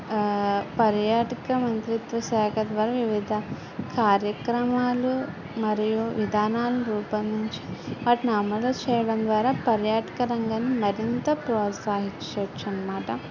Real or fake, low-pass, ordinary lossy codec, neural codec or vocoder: real; 7.2 kHz; none; none